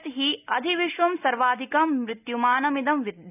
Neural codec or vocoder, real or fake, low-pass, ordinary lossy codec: none; real; 3.6 kHz; none